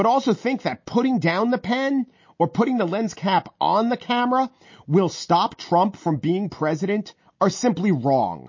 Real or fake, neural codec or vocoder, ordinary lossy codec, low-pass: real; none; MP3, 32 kbps; 7.2 kHz